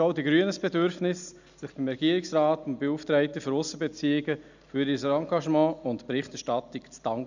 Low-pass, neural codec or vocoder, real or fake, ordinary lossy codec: 7.2 kHz; none; real; none